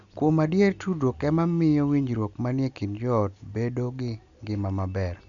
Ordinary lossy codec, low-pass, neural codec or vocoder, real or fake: none; 7.2 kHz; none; real